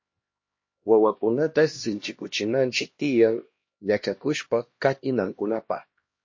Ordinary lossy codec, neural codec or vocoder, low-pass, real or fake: MP3, 32 kbps; codec, 16 kHz, 1 kbps, X-Codec, HuBERT features, trained on LibriSpeech; 7.2 kHz; fake